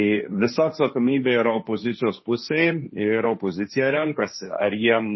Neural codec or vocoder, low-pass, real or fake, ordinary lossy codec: codec, 16 kHz, 1.1 kbps, Voila-Tokenizer; 7.2 kHz; fake; MP3, 24 kbps